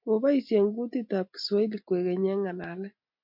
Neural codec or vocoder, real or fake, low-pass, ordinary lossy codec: none; real; 5.4 kHz; MP3, 48 kbps